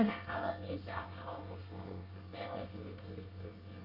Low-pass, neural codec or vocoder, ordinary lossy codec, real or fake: 5.4 kHz; codec, 24 kHz, 1 kbps, SNAC; none; fake